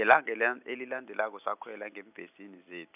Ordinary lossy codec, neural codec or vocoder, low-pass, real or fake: none; none; 3.6 kHz; real